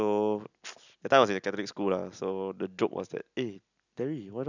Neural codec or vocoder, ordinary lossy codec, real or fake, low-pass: none; none; real; 7.2 kHz